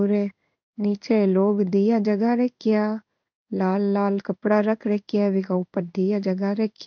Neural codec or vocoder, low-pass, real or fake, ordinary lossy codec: codec, 16 kHz in and 24 kHz out, 1 kbps, XY-Tokenizer; 7.2 kHz; fake; none